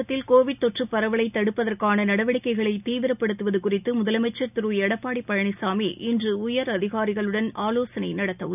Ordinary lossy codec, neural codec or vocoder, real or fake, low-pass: none; none; real; 3.6 kHz